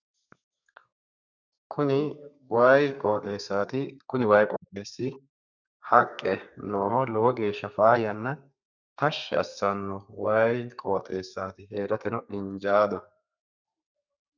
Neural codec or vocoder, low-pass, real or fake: codec, 32 kHz, 1.9 kbps, SNAC; 7.2 kHz; fake